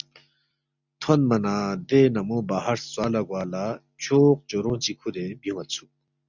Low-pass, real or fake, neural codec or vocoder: 7.2 kHz; real; none